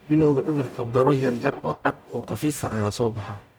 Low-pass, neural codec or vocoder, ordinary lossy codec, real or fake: none; codec, 44.1 kHz, 0.9 kbps, DAC; none; fake